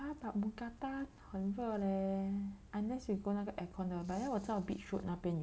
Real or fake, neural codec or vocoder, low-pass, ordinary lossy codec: real; none; none; none